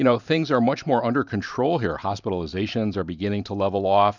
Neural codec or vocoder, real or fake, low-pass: vocoder, 44.1 kHz, 128 mel bands every 512 samples, BigVGAN v2; fake; 7.2 kHz